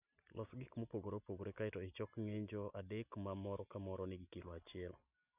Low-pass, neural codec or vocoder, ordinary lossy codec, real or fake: 3.6 kHz; none; none; real